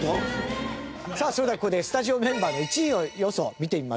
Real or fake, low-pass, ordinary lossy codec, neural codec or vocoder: real; none; none; none